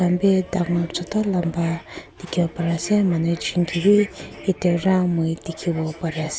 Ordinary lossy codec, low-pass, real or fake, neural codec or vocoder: none; none; real; none